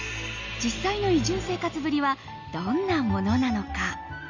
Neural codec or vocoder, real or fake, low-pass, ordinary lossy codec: none; real; 7.2 kHz; none